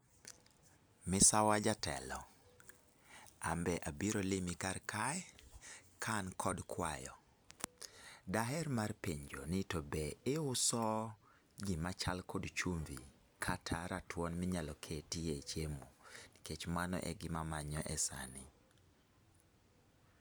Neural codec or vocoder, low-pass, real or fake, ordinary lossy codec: none; none; real; none